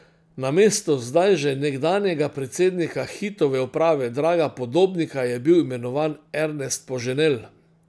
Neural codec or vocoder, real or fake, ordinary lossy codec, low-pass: none; real; none; none